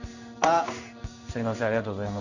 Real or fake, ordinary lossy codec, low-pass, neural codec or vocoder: fake; none; 7.2 kHz; codec, 16 kHz in and 24 kHz out, 1 kbps, XY-Tokenizer